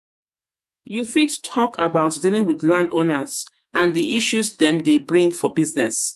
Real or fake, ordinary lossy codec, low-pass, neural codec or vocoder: fake; none; 14.4 kHz; codec, 44.1 kHz, 2.6 kbps, SNAC